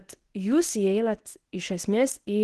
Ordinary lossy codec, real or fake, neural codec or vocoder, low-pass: Opus, 16 kbps; fake; codec, 24 kHz, 0.9 kbps, WavTokenizer, medium speech release version 1; 10.8 kHz